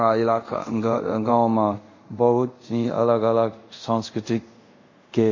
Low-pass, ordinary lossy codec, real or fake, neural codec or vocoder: 7.2 kHz; MP3, 32 kbps; fake; codec, 24 kHz, 0.5 kbps, DualCodec